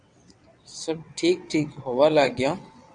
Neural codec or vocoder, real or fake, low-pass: vocoder, 22.05 kHz, 80 mel bands, WaveNeXt; fake; 9.9 kHz